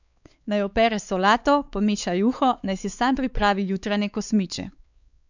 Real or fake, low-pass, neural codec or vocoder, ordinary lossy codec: fake; 7.2 kHz; codec, 16 kHz, 4 kbps, X-Codec, WavLM features, trained on Multilingual LibriSpeech; none